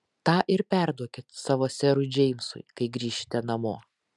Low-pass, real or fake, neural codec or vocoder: 10.8 kHz; real; none